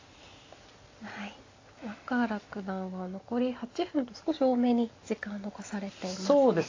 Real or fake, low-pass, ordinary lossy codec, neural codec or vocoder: real; 7.2 kHz; AAC, 32 kbps; none